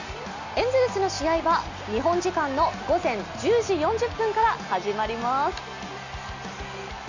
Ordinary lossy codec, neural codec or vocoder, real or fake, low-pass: Opus, 64 kbps; none; real; 7.2 kHz